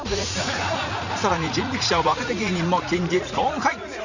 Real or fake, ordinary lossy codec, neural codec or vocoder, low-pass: fake; none; vocoder, 44.1 kHz, 80 mel bands, Vocos; 7.2 kHz